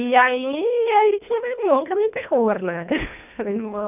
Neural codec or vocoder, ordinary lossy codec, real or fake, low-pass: codec, 24 kHz, 1.5 kbps, HILCodec; none; fake; 3.6 kHz